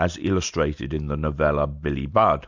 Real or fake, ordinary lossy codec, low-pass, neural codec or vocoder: real; MP3, 64 kbps; 7.2 kHz; none